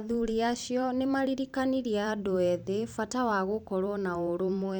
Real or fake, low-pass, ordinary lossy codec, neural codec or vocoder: fake; 19.8 kHz; none; vocoder, 44.1 kHz, 128 mel bands every 512 samples, BigVGAN v2